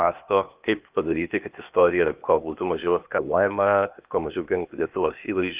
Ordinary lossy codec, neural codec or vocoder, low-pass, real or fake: Opus, 24 kbps; codec, 16 kHz, 0.8 kbps, ZipCodec; 3.6 kHz; fake